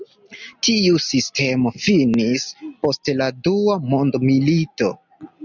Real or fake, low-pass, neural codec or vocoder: real; 7.2 kHz; none